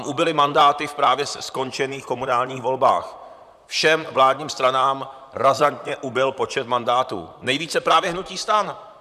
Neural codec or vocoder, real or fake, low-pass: vocoder, 44.1 kHz, 128 mel bands, Pupu-Vocoder; fake; 14.4 kHz